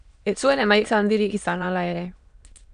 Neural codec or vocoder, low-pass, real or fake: autoencoder, 22.05 kHz, a latent of 192 numbers a frame, VITS, trained on many speakers; 9.9 kHz; fake